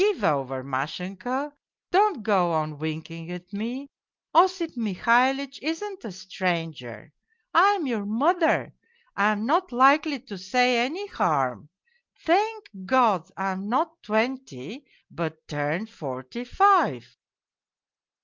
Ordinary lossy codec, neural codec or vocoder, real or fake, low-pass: Opus, 24 kbps; none; real; 7.2 kHz